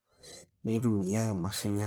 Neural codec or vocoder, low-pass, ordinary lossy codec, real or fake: codec, 44.1 kHz, 1.7 kbps, Pupu-Codec; none; none; fake